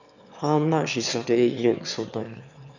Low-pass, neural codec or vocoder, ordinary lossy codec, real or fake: 7.2 kHz; autoencoder, 22.05 kHz, a latent of 192 numbers a frame, VITS, trained on one speaker; none; fake